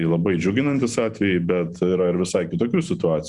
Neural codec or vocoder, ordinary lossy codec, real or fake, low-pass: none; Opus, 64 kbps; real; 10.8 kHz